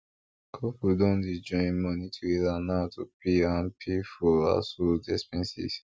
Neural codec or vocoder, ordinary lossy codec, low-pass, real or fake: none; none; none; real